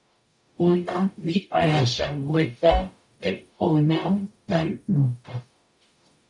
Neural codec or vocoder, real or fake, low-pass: codec, 44.1 kHz, 0.9 kbps, DAC; fake; 10.8 kHz